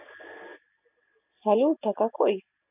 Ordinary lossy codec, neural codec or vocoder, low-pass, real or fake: none; none; 3.6 kHz; real